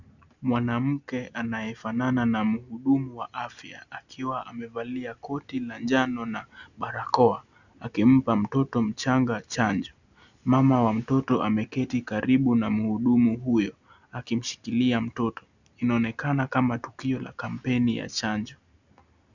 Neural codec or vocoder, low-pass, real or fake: none; 7.2 kHz; real